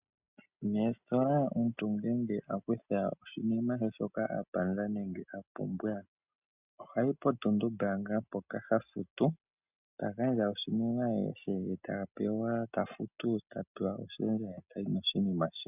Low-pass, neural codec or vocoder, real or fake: 3.6 kHz; none; real